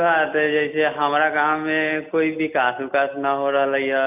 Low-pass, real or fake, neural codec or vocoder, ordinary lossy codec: 3.6 kHz; real; none; none